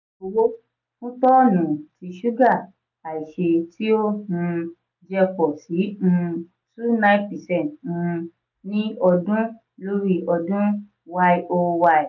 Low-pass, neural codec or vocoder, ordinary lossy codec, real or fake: 7.2 kHz; none; none; real